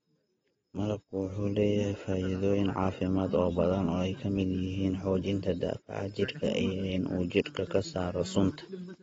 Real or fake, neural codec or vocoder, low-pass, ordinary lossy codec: real; none; 7.2 kHz; AAC, 24 kbps